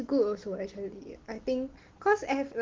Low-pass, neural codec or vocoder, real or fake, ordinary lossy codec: 7.2 kHz; none; real; Opus, 16 kbps